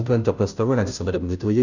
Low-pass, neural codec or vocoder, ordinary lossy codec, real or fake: 7.2 kHz; codec, 16 kHz, 0.5 kbps, FunCodec, trained on Chinese and English, 25 frames a second; none; fake